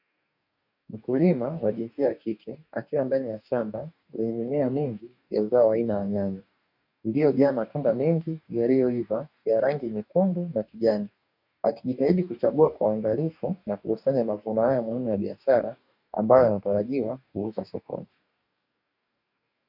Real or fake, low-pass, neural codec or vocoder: fake; 5.4 kHz; codec, 44.1 kHz, 2.6 kbps, DAC